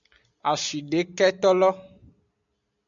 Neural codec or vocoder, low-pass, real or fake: none; 7.2 kHz; real